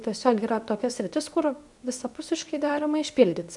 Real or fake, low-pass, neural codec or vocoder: fake; 10.8 kHz; codec, 24 kHz, 0.9 kbps, WavTokenizer, medium speech release version 2